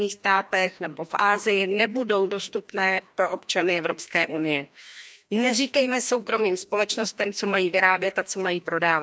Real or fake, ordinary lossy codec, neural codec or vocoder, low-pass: fake; none; codec, 16 kHz, 1 kbps, FreqCodec, larger model; none